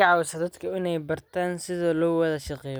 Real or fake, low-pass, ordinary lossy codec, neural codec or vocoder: real; none; none; none